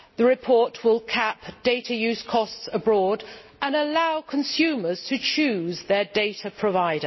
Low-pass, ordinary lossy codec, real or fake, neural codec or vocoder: 7.2 kHz; MP3, 24 kbps; real; none